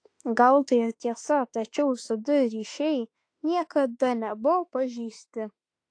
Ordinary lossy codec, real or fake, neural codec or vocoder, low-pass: AAC, 48 kbps; fake; autoencoder, 48 kHz, 32 numbers a frame, DAC-VAE, trained on Japanese speech; 9.9 kHz